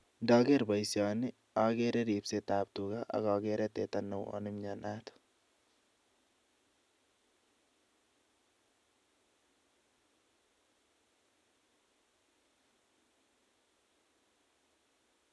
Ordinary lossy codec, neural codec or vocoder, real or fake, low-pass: none; none; real; none